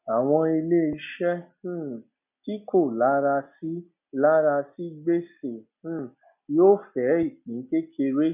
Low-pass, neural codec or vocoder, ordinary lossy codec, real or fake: 3.6 kHz; none; none; real